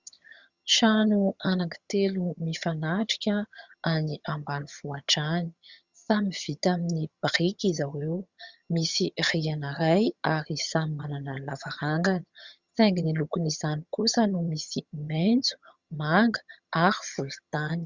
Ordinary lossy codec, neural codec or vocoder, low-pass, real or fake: Opus, 64 kbps; vocoder, 22.05 kHz, 80 mel bands, HiFi-GAN; 7.2 kHz; fake